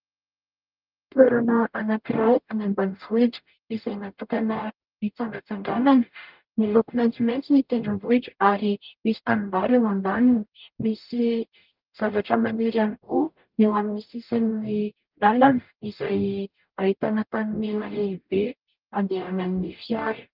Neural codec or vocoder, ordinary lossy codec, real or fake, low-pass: codec, 44.1 kHz, 0.9 kbps, DAC; Opus, 32 kbps; fake; 5.4 kHz